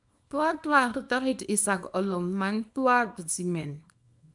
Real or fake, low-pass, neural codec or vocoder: fake; 10.8 kHz; codec, 24 kHz, 0.9 kbps, WavTokenizer, small release